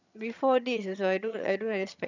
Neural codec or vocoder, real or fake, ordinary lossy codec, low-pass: vocoder, 22.05 kHz, 80 mel bands, HiFi-GAN; fake; none; 7.2 kHz